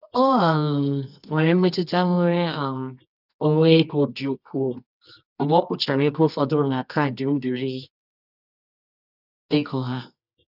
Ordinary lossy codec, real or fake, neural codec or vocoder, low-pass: none; fake; codec, 24 kHz, 0.9 kbps, WavTokenizer, medium music audio release; 5.4 kHz